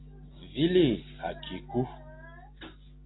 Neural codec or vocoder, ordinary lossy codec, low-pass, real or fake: none; AAC, 16 kbps; 7.2 kHz; real